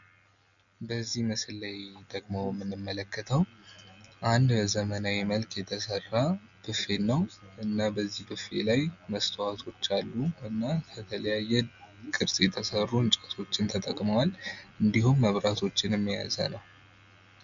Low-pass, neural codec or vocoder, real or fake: 7.2 kHz; none; real